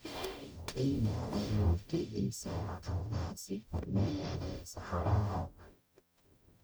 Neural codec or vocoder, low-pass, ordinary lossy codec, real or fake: codec, 44.1 kHz, 0.9 kbps, DAC; none; none; fake